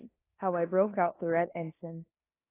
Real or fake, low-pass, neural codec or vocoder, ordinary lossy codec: fake; 3.6 kHz; codec, 16 kHz in and 24 kHz out, 0.9 kbps, LongCat-Audio-Codec, four codebook decoder; AAC, 24 kbps